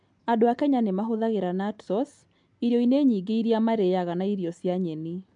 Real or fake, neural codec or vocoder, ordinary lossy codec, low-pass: real; none; MP3, 64 kbps; 9.9 kHz